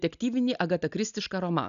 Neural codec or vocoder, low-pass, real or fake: none; 7.2 kHz; real